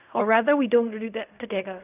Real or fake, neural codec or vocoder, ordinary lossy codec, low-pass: fake; codec, 16 kHz in and 24 kHz out, 0.4 kbps, LongCat-Audio-Codec, fine tuned four codebook decoder; none; 3.6 kHz